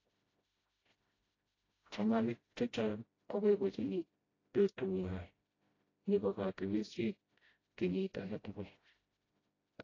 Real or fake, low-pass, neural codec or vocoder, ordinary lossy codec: fake; 7.2 kHz; codec, 16 kHz, 0.5 kbps, FreqCodec, smaller model; AAC, 32 kbps